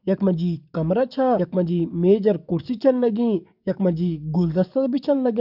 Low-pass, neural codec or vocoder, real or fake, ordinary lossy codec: 5.4 kHz; codec, 44.1 kHz, 7.8 kbps, DAC; fake; none